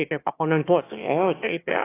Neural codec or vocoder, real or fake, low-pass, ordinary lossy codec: autoencoder, 22.05 kHz, a latent of 192 numbers a frame, VITS, trained on one speaker; fake; 3.6 kHz; AAC, 16 kbps